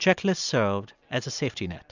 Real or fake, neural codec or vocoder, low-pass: real; none; 7.2 kHz